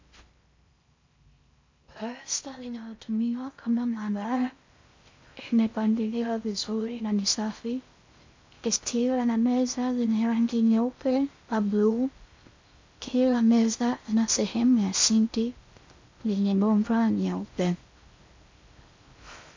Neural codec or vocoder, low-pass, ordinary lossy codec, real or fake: codec, 16 kHz in and 24 kHz out, 0.6 kbps, FocalCodec, streaming, 4096 codes; 7.2 kHz; MP3, 64 kbps; fake